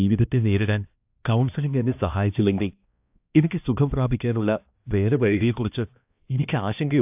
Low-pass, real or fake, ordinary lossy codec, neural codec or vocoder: 3.6 kHz; fake; AAC, 32 kbps; codec, 16 kHz, 1 kbps, X-Codec, HuBERT features, trained on balanced general audio